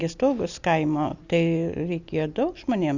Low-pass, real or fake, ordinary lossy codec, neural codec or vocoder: 7.2 kHz; real; Opus, 64 kbps; none